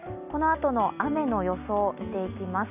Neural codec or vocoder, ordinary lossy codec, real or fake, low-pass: none; none; real; 3.6 kHz